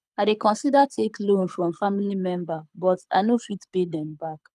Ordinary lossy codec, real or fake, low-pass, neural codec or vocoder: none; fake; none; codec, 24 kHz, 6 kbps, HILCodec